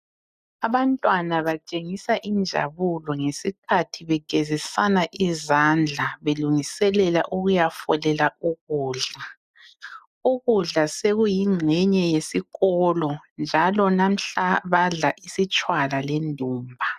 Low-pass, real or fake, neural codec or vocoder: 14.4 kHz; real; none